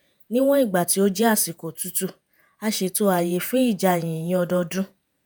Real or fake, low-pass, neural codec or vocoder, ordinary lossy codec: fake; none; vocoder, 48 kHz, 128 mel bands, Vocos; none